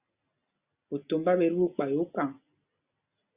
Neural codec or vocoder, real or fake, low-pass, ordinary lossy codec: none; real; 3.6 kHz; Opus, 64 kbps